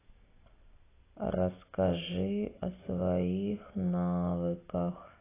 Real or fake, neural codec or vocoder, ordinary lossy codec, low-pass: real; none; AAC, 16 kbps; 3.6 kHz